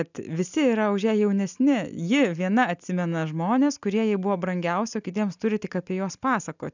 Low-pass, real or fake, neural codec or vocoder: 7.2 kHz; real; none